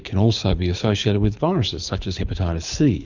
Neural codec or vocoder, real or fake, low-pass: codec, 24 kHz, 6 kbps, HILCodec; fake; 7.2 kHz